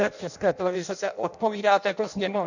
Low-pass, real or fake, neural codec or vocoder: 7.2 kHz; fake; codec, 16 kHz in and 24 kHz out, 0.6 kbps, FireRedTTS-2 codec